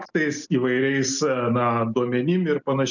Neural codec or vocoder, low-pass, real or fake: none; 7.2 kHz; real